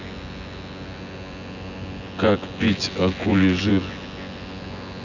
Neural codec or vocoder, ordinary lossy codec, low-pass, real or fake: vocoder, 24 kHz, 100 mel bands, Vocos; none; 7.2 kHz; fake